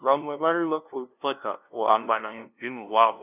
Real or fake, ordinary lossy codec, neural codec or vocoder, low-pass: fake; none; codec, 16 kHz, 0.5 kbps, FunCodec, trained on LibriTTS, 25 frames a second; 3.6 kHz